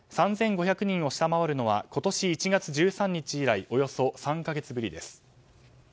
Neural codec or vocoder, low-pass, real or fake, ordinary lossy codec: none; none; real; none